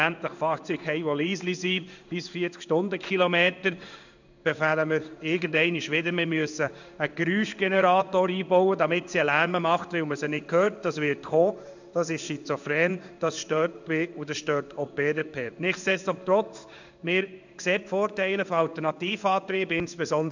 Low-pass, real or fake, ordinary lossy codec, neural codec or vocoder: 7.2 kHz; fake; none; codec, 16 kHz in and 24 kHz out, 1 kbps, XY-Tokenizer